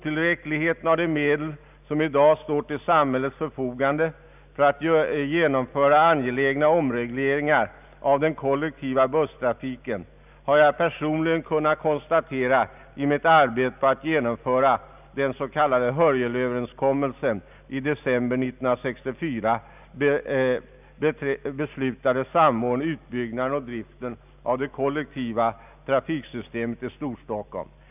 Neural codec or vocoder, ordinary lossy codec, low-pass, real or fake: none; none; 3.6 kHz; real